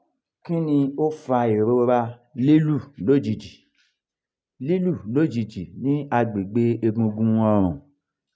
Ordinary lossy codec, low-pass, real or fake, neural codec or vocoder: none; none; real; none